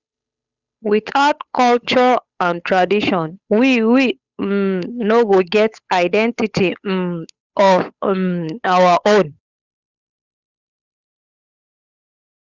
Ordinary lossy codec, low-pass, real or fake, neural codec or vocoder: Opus, 64 kbps; 7.2 kHz; fake; codec, 16 kHz, 8 kbps, FunCodec, trained on Chinese and English, 25 frames a second